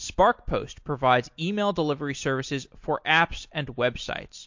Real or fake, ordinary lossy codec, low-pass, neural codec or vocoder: real; MP3, 48 kbps; 7.2 kHz; none